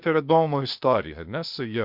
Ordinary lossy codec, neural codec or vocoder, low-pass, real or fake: Opus, 64 kbps; codec, 16 kHz, 0.8 kbps, ZipCodec; 5.4 kHz; fake